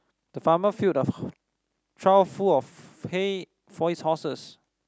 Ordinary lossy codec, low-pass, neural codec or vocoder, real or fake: none; none; none; real